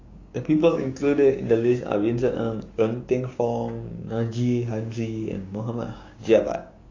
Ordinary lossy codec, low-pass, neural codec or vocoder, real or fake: AAC, 32 kbps; 7.2 kHz; codec, 16 kHz, 6 kbps, DAC; fake